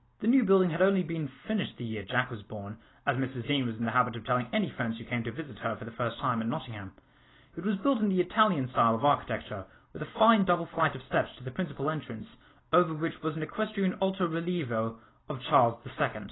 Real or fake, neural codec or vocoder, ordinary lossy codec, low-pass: real; none; AAC, 16 kbps; 7.2 kHz